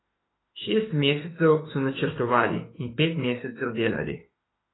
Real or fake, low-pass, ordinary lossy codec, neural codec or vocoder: fake; 7.2 kHz; AAC, 16 kbps; autoencoder, 48 kHz, 32 numbers a frame, DAC-VAE, trained on Japanese speech